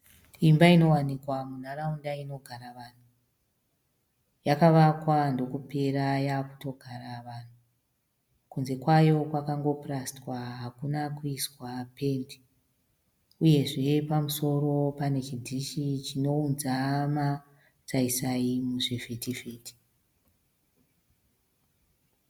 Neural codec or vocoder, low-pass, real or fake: none; 19.8 kHz; real